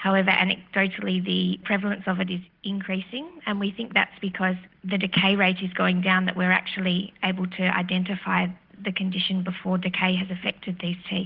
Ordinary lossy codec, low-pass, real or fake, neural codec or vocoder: Opus, 16 kbps; 5.4 kHz; real; none